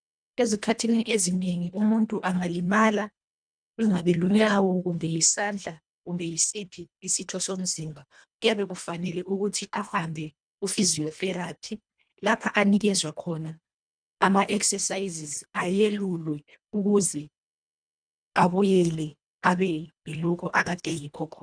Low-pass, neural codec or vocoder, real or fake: 9.9 kHz; codec, 24 kHz, 1.5 kbps, HILCodec; fake